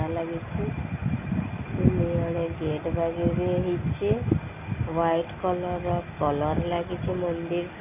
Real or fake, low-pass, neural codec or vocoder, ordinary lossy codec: real; 3.6 kHz; none; none